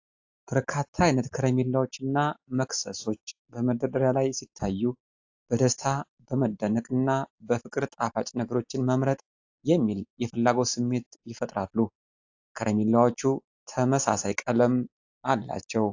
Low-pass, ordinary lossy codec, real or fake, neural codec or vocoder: 7.2 kHz; AAC, 48 kbps; real; none